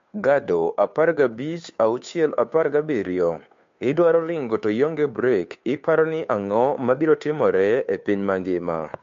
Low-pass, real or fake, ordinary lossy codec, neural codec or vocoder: 7.2 kHz; fake; MP3, 48 kbps; codec, 16 kHz, 2 kbps, FunCodec, trained on Chinese and English, 25 frames a second